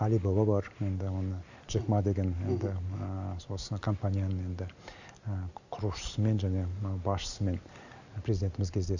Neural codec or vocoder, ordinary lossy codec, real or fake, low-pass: none; none; real; 7.2 kHz